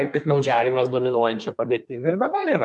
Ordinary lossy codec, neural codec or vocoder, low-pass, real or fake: MP3, 64 kbps; codec, 24 kHz, 1 kbps, SNAC; 10.8 kHz; fake